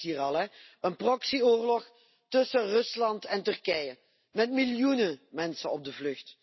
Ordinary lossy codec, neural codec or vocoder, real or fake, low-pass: MP3, 24 kbps; none; real; 7.2 kHz